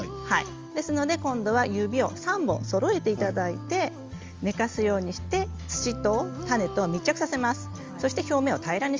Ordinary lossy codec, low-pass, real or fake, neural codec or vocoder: Opus, 32 kbps; 7.2 kHz; real; none